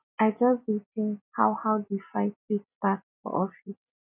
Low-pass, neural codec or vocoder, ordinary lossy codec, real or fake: 3.6 kHz; none; none; real